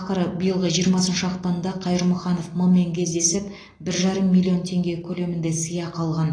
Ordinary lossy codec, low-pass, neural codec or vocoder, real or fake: AAC, 32 kbps; 9.9 kHz; none; real